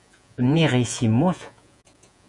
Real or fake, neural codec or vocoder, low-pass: fake; vocoder, 48 kHz, 128 mel bands, Vocos; 10.8 kHz